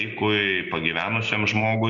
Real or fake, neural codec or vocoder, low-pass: real; none; 7.2 kHz